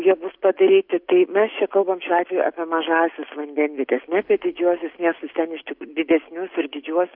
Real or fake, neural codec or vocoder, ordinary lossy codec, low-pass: real; none; MP3, 48 kbps; 5.4 kHz